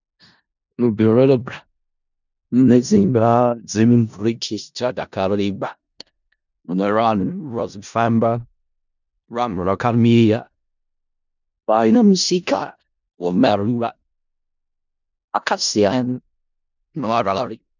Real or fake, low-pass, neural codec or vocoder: fake; 7.2 kHz; codec, 16 kHz in and 24 kHz out, 0.4 kbps, LongCat-Audio-Codec, four codebook decoder